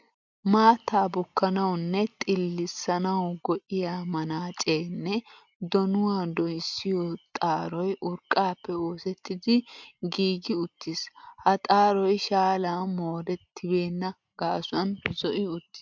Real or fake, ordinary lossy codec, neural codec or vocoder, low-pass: real; MP3, 64 kbps; none; 7.2 kHz